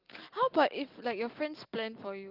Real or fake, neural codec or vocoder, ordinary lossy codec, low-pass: real; none; Opus, 32 kbps; 5.4 kHz